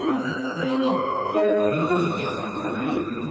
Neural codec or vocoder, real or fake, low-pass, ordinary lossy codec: codec, 16 kHz, 2 kbps, FreqCodec, smaller model; fake; none; none